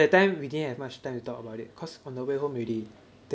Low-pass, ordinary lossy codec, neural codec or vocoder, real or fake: none; none; none; real